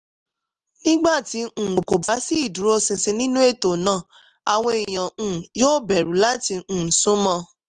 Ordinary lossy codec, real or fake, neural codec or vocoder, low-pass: Opus, 24 kbps; real; none; 10.8 kHz